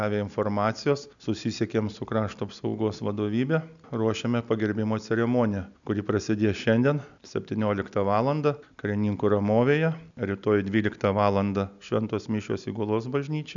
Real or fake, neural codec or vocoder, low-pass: real; none; 7.2 kHz